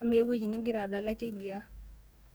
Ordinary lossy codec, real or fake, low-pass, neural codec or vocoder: none; fake; none; codec, 44.1 kHz, 2.6 kbps, DAC